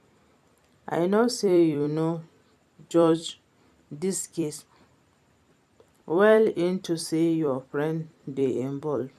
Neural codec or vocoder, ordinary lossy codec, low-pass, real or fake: vocoder, 44.1 kHz, 128 mel bands every 256 samples, BigVGAN v2; none; 14.4 kHz; fake